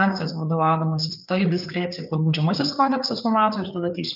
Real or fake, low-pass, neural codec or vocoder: fake; 5.4 kHz; codec, 16 kHz, 4 kbps, FreqCodec, larger model